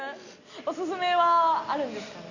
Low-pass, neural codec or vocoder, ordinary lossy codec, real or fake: 7.2 kHz; none; none; real